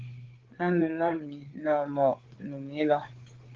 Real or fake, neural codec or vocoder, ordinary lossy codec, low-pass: fake; codec, 16 kHz, 4 kbps, FreqCodec, larger model; Opus, 16 kbps; 7.2 kHz